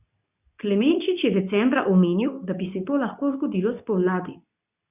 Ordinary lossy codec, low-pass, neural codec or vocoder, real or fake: none; 3.6 kHz; codec, 24 kHz, 0.9 kbps, WavTokenizer, medium speech release version 2; fake